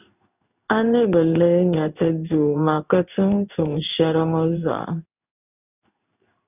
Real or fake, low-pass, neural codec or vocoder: fake; 3.6 kHz; codec, 16 kHz in and 24 kHz out, 1 kbps, XY-Tokenizer